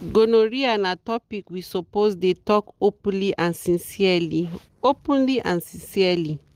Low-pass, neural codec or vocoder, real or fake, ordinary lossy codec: 14.4 kHz; none; real; Opus, 24 kbps